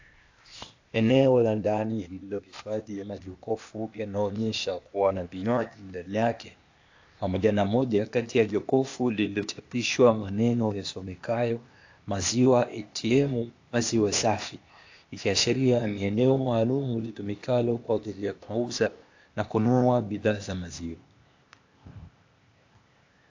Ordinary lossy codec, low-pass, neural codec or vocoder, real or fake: AAC, 48 kbps; 7.2 kHz; codec, 16 kHz, 0.8 kbps, ZipCodec; fake